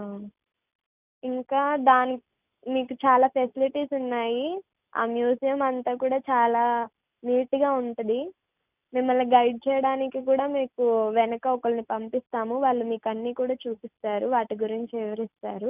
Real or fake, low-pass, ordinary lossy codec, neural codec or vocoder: real; 3.6 kHz; none; none